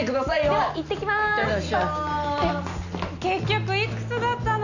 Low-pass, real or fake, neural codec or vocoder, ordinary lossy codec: 7.2 kHz; real; none; none